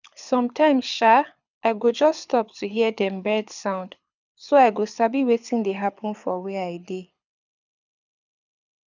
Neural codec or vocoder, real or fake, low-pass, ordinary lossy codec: codec, 24 kHz, 6 kbps, HILCodec; fake; 7.2 kHz; none